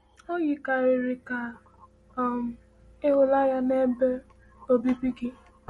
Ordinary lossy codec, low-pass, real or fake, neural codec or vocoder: MP3, 48 kbps; 19.8 kHz; real; none